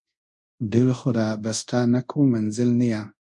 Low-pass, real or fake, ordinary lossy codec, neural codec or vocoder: 10.8 kHz; fake; MP3, 64 kbps; codec, 24 kHz, 0.5 kbps, DualCodec